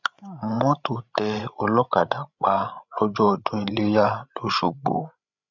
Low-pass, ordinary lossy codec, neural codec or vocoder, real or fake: 7.2 kHz; none; codec, 16 kHz, 16 kbps, FreqCodec, larger model; fake